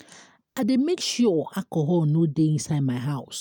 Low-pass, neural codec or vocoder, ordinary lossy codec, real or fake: 19.8 kHz; none; none; real